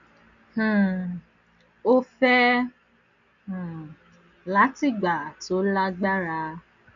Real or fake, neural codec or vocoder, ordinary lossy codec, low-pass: real; none; none; 7.2 kHz